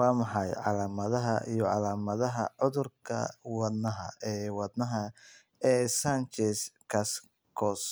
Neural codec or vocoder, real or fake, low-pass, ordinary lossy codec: none; real; none; none